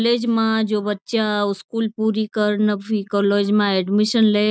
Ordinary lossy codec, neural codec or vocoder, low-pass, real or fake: none; none; none; real